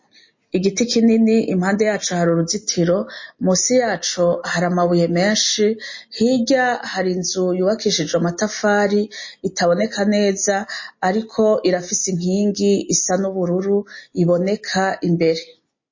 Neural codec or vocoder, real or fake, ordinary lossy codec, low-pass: none; real; MP3, 32 kbps; 7.2 kHz